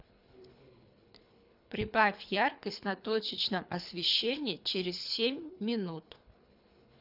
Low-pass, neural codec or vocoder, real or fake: 5.4 kHz; codec, 24 kHz, 3 kbps, HILCodec; fake